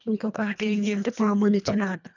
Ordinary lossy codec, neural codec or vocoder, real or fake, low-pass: none; codec, 24 kHz, 1.5 kbps, HILCodec; fake; 7.2 kHz